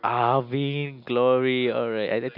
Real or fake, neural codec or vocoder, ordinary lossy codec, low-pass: real; none; none; 5.4 kHz